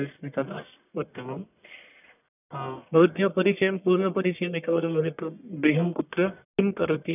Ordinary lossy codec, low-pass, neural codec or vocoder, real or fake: none; 3.6 kHz; codec, 44.1 kHz, 1.7 kbps, Pupu-Codec; fake